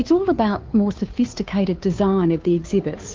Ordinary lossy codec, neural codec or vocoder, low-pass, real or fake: Opus, 32 kbps; codec, 16 kHz, 2 kbps, FunCodec, trained on Chinese and English, 25 frames a second; 7.2 kHz; fake